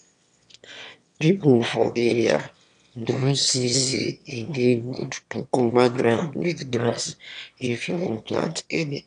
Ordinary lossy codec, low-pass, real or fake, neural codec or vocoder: none; 9.9 kHz; fake; autoencoder, 22.05 kHz, a latent of 192 numbers a frame, VITS, trained on one speaker